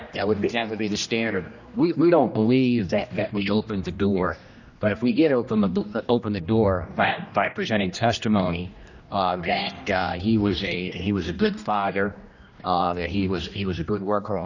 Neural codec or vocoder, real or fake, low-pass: codec, 16 kHz, 1 kbps, X-Codec, HuBERT features, trained on general audio; fake; 7.2 kHz